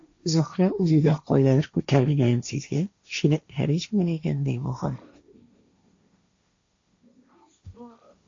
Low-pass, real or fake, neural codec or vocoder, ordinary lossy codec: 7.2 kHz; fake; codec, 16 kHz, 1.1 kbps, Voila-Tokenizer; AAC, 48 kbps